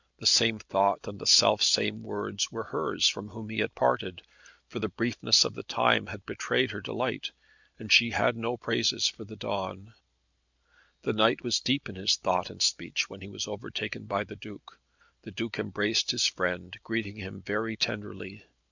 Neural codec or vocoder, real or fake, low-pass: none; real; 7.2 kHz